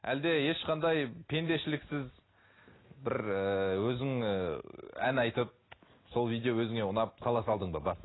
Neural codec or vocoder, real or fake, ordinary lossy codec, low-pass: none; real; AAC, 16 kbps; 7.2 kHz